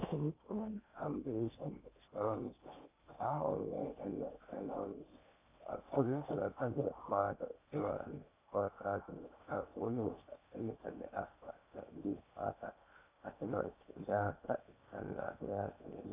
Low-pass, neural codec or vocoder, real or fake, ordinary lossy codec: 3.6 kHz; codec, 16 kHz in and 24 kHz out, 0.8 kbps, FocalCodec, streaming, 65536 codes; fake; AAC, 32 kbps